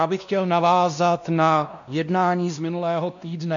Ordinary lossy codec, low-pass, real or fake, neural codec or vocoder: MP3, 96 kbps; 7.2 kHz; fake; codec, 16 kHz, 1 kbps, X-Codec, WavLM features, trained on Multilingual LibriSpeech